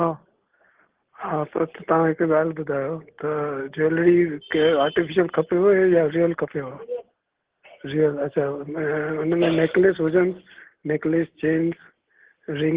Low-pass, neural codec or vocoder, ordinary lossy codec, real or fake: 3.6 kHz; none; Opus, 16 kbps; real